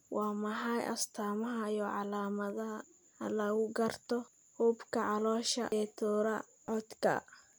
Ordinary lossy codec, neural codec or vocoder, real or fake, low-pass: none; none; real; none